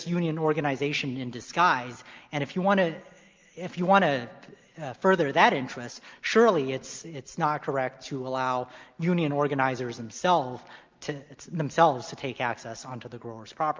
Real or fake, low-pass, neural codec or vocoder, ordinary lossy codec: real; 7.2 kHz; none; Opus, 24 kbps